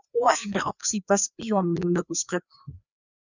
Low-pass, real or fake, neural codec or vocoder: 7.2 kHz; fake; codec, 16 kHz, 2 kbps, FreqCodec, larger model